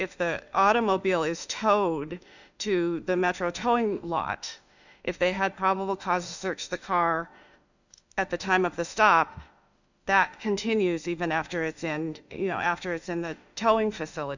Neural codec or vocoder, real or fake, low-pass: autoencoder, 48 kHz, 32 numbers a frame, DAC-VAE, trained on Japanese speech; fake; 7.2 kHz